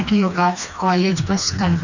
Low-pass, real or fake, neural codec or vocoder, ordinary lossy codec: 7.2 kHz; fake; codec, 16 kHz, 2 kbps, FreqCodec, smaller model; none